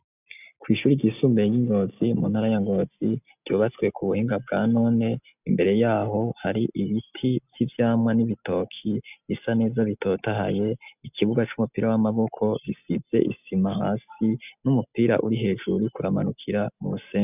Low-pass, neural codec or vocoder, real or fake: 3.6 kHz; none; real